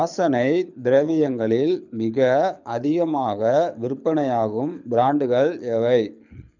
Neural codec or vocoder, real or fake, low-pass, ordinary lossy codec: codec, 24 kHz, 6 kbps, HILCodec; fake; 7.2 kHz; none